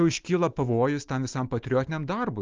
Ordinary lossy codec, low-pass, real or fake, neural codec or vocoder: Opus, 32 kbps; 7.2 kHz; real; none